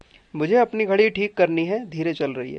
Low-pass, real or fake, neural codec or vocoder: 9.9 kHz; real; none